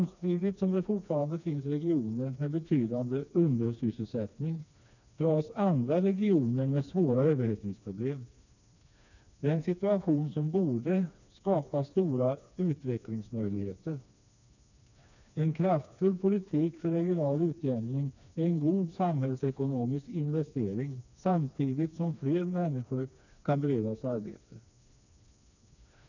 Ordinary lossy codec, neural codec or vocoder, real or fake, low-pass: none; codec, 16 kHz, 2 kbps, FreqCodec, smaller model; fake; 7.2 kHz